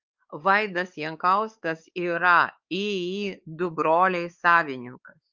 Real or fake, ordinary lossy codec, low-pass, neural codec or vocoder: fake; Opus, 24 kbps; 7.2 kHz; codec, 16 kHz, 4 kbps, X-Codec, WavLM features, trained on Multilingual LibriSpeech